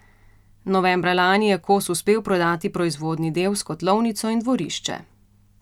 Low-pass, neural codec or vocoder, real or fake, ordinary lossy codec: 19.8 kHz; none; real; none